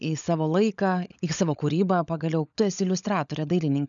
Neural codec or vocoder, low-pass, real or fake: codec, 16 kHz, 16 kbps, FunCodec, trained on LibriTTS, 50 frames a second; 7.2 kHz; fake